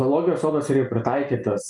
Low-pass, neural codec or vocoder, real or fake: 10.8 kHz; none; real